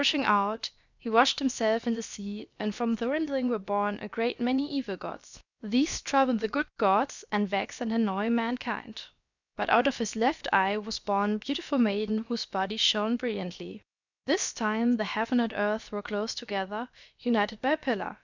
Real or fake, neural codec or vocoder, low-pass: fake; codec, 16 kHz, about 1 kbps, DyCAST, with the encoder's durations; 7.2 kHz